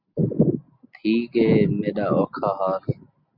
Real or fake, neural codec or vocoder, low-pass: real; none; 5.4 kHz